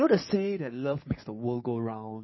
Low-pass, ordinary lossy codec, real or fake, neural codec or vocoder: 7.2 kHz; MP3, 24 kbps; fake; codec, 16 kHz in and 24 kHz out, 2.2 kbps, FireRedTTS-2 codec